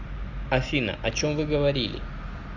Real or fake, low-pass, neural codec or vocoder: real; 7.2 kHz; none